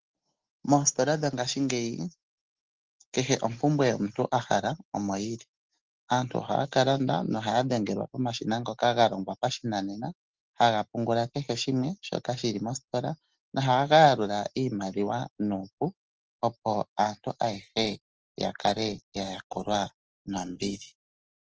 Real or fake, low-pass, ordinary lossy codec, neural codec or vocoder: real; 7.2 kHz; Opus, 16 kbps; none